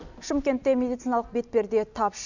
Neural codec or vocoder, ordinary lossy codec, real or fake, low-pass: none; none; real; 7.2 kHz